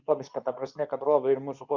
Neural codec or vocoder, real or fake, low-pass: codec, 24 kHz, 0.9 kbps, WavTokenizer, medium speech release version 2; fake; 7.2 kHz